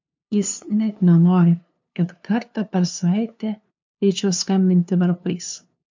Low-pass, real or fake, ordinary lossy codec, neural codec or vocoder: 7.2 kHz; fake; MP3, 64 kbps; codec, 16 kHz, 2 kbps, FunCodec, trained on LibriTTS, 25 frames a second